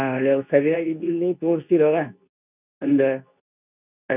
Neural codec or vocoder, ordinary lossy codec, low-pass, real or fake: codec, 24 kHz, 0.9 kbps, WavTokenizer, medium speech release version 2; none; 3.6 kHz; fake